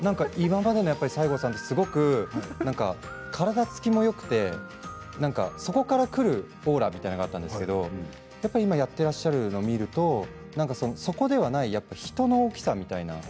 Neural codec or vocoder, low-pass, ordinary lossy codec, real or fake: none; none; none; real